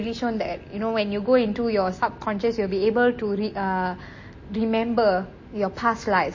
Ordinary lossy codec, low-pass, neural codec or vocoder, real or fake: MP3, 32 kbps; 7.2 kHz; none; real